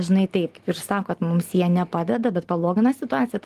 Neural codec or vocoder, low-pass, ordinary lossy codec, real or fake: none; 14.4 kHz; Opus, 24 kbps; real